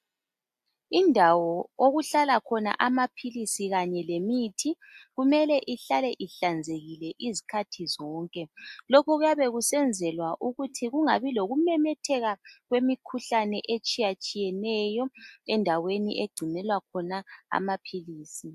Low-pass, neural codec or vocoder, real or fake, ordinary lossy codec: 14.4 kHz; none; real; AAC, 96 kbps